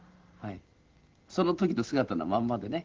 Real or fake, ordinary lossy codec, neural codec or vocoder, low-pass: real; Opus, 16 kbps; none; 7.2 kHz